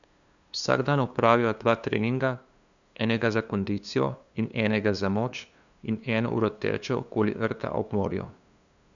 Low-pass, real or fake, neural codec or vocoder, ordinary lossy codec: 7.2 kHz; fake; codec, 16 kHz, 2 kbps, FunCodec, trained on LibriTTS, 25 frames a second; none